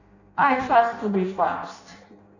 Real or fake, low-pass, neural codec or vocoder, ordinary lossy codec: fake; 7.2 kHz; codec, 16 kHz in and 24 kHz out, 0.6 kbps, FireRedTTS-2 codec; none